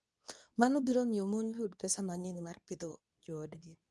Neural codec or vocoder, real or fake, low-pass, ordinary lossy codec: codec, 24 kHz, 0.9 kbps, WavTokenizer, medium speech release version 2; fake; none; none